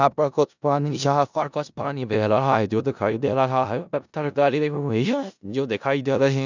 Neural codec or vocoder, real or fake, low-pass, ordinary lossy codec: codec, 16 kHz in and 24 kHz out, 0.4 kbps, LongCat-Audio-Codec, four codebook decoder; fake; 7.2 kHz; none